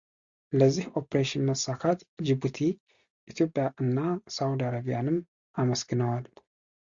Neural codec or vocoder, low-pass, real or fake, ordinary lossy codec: none; 7.2 kHz; real; Opus, 64 kbps